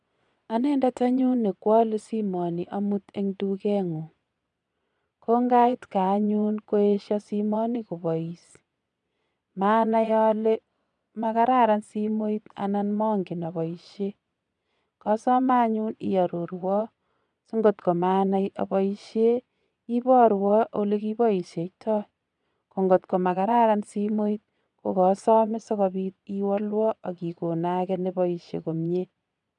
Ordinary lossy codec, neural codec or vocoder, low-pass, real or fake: none; vocoder, 22.05 kHz, 80 mel bands, Vocos; 9.9 kHz; fake